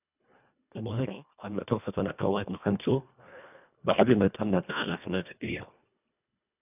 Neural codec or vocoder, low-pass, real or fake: codec, 24 kHz, 1.5 kbps, HILCodec; 3.6 kHz; fake